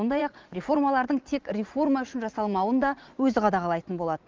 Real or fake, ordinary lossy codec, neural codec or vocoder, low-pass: fake; Opus, 32 kbps; vocoder, 44.1 kHz, 80 mel bands, Vocos; 7.2 kHz